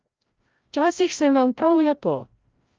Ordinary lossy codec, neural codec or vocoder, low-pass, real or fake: Opus, 24 kbps; codec, 16 kHz, 0.5 kbps, FreqCodec, larger model; 7.2 kHz; fake